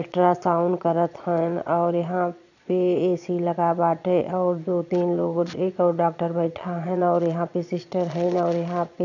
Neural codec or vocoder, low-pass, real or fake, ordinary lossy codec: vocoder, 22.05 kHz, 80 mel bands, Vocos; 7.2 kHz; fake; none